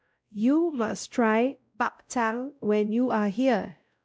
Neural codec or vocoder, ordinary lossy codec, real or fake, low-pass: codec, 16 kHz, 0.5 kbps, X-Codec, WavLM features, trained on Multilingual LibriSpeech; none; fake; none